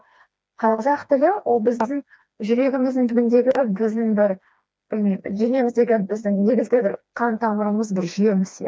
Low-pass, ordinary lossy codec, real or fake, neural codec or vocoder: none; none; fake; codec, 16 kHz, 2 kbps, FreqCodec, smaller model